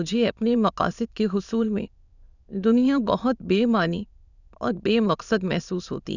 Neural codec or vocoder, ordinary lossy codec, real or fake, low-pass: autoencoder, 22.05 kHz, a latent of 192 numbers a frame, VITS, trained on many speakers; none; fake; 7.2 kHz